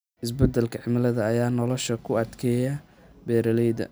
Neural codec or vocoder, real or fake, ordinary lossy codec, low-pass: none; real; none; none